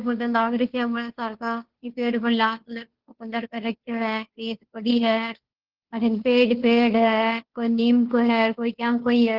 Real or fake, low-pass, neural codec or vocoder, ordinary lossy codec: fake; 5.4 kHz; codec, 16 kHz, 2 kbps, FunCodec, trained on Chinese and English, 25 frames a second; Opus, 16 kbps